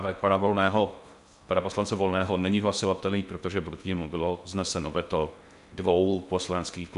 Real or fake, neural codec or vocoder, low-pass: fake; codec, 16 kHz in and 24 kHz out, 0.6 kbps, FocalCodec, streaming, 2048 codes; 10.8 kHz